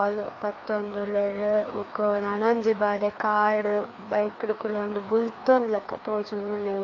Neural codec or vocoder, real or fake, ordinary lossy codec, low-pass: codec, 16 kHz, 2 kbps, FreqCodec, larger model; fake; AAC, 48 kbps; 7.2 kHz